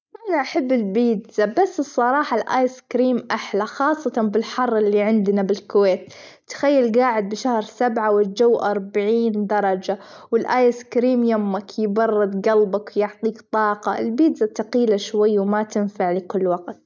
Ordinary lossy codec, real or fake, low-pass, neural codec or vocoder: none; real; none; none